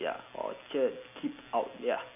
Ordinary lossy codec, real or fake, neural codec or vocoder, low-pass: none; real; none; 3.6 kHz